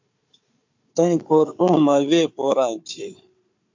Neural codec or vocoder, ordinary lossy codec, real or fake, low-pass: codec, 16 kHz, 4 kbps, FunCodec, trained on Chinese and English, 50 frames a second; MP3, 48 kbps; fake; 7.2 kHz